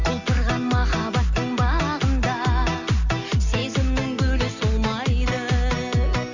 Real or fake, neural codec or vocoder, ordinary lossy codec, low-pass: real; none; Opus, 64 kbps; 7.2 kHz